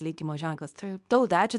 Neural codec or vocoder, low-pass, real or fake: codec, 24 kHz, 0.9 kbps, WavTokenizer, medium speech release version 2; 10.8 kHz; fake